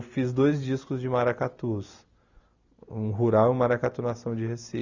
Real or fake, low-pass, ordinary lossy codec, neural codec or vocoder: real; 7.2 kHz; none; none